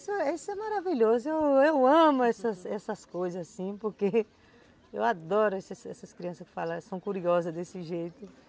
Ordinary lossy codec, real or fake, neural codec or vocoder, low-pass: none; real; none; none